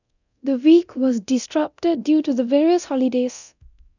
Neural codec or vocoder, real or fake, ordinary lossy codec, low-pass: codec, 24 kHz, 0.9 kbps, DualCodec; fake; none; 7.2 kHz